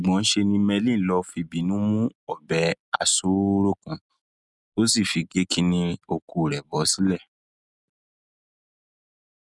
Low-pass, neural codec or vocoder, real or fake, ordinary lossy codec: 10.8 kHz; none; real; none